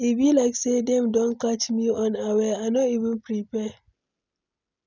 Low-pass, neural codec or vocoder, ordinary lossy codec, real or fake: 7.2 kHz; none; none; real